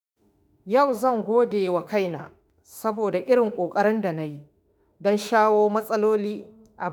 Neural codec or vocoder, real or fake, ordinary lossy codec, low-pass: autoencoder, 48 kHz, 32 numbers a frame, DAC-VAE, trained on Japanese speech; fake; none; none